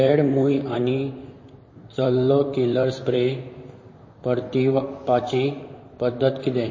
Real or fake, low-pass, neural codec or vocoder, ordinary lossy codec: fake; 7.2 kHz; vocoder, 44.1 kHz, 128 mel bands, Pupu-Vocoder; MP3, 32 kbps